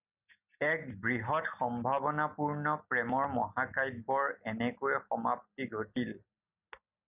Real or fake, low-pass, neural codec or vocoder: real; 3.6 kHz; none